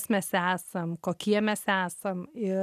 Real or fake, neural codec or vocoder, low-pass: real; none; 14.4 kHz